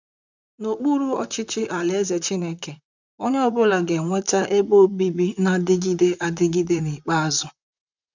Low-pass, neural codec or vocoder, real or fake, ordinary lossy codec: 7.2 kHz; vocoder, 44.1 kHz, 128 mel bands, Pupu-Vocoder; fake; none